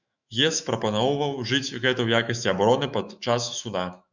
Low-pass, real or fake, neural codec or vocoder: 7.2 kHz; fake; autoencoder, 48 kHz, 128 numbers a frame, DAC-VAE, trained on Japanese speech